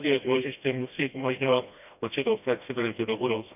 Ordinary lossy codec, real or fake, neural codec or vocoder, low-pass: none; fake; codec, 16 kHz, 1 kbps, FreqCodec, smaller model; 3.6 kHz